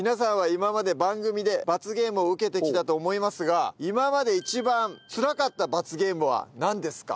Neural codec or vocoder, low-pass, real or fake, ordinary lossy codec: none; none; real; none